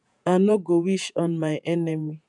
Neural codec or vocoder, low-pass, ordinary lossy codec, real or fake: vocoder, 48 kHz, 128 mel bands, Vocos; 10.8 kHz; none; fake